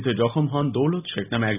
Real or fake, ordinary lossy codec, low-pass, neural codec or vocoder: real; none; 3.6 kHz; none